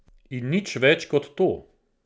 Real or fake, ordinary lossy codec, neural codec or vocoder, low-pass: real; none; none; none